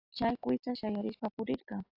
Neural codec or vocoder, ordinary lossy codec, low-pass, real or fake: none; AAC, 48 kbps; 5.4 kHz; real